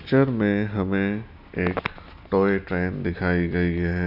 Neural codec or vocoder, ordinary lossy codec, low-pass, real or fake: none; none; 5.4 kHz; real